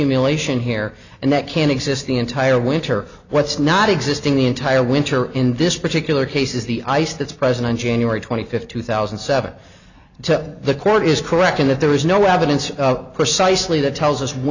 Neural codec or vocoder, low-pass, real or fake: none; 7.2 kHz; real